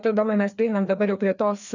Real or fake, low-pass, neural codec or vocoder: fake; 7.2 kHz; codec, 16 kHz, 2 kbps, FreqCodec, larger model